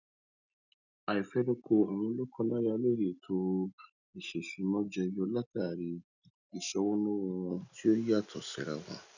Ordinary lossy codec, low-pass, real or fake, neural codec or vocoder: none; 7.2 kHz; real; none